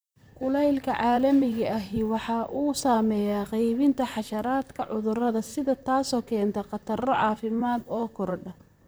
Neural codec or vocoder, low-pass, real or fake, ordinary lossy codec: vocoder, 44.1 kHz, 128 mel bands, Pupu-Vocoder; none; fake; none